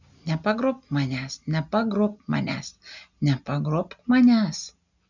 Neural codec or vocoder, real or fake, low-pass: none; real; 7.2 kHz